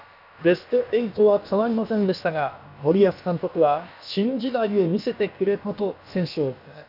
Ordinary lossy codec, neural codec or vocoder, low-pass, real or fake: none; codec, 16 kHz, about 1 kbps, DyCAST, with the encoder's durations; 5.4 kHz; fake